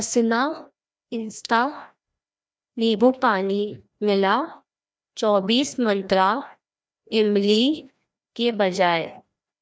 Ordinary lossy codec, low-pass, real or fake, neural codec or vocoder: none; none; fake; codec, 16 kHz, 1 kbps, FreqCodec, larger model